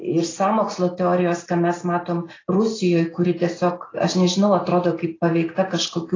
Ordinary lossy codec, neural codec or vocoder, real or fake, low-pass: AAC, 32 kbps; none; real; 7.2 kHz